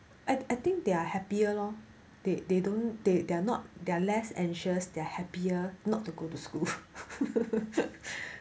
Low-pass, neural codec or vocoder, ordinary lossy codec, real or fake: none; none; none; real